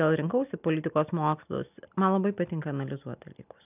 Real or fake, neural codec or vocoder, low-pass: real; none; 3.6 kHz